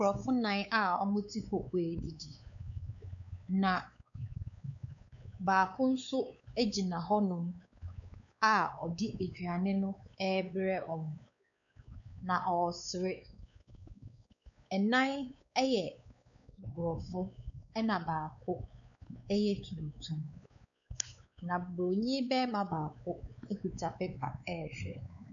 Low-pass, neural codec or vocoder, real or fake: 7.2 kHz; codec, 16 kHz, 4 kbps, X-Codec, WavLM features, trained on Multilingual LibriSpeech; fake